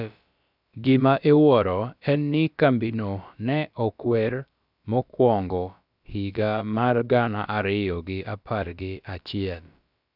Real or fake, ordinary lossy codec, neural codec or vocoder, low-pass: fake; none; codec, 16 kHz, about 1 kbps, DyCAST, with the encoder's durations; 5.4 kHz